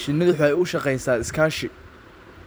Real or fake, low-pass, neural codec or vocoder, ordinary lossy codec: fake; none; vocoder, 44.1 kHz, 128 mel bands every 256 samples, BigVGAN v2; none